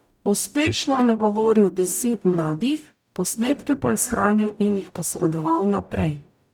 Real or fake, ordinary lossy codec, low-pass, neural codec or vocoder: fake; none; none; codec, 44.1 kHz, 0.9 kbps, DAC